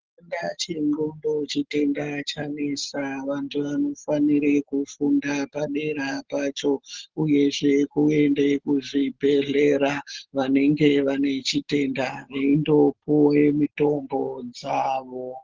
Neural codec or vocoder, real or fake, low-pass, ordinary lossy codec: none; real; 7.2 kHz; Opus, 16 kbps